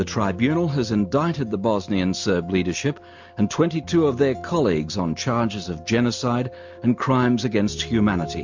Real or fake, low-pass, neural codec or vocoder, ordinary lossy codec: real; 7.2 kHz; none; MP3, 48 kbps